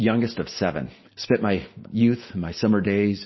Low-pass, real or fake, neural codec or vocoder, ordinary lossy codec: 7.2 kHz; real; none; MP3, 24 kbps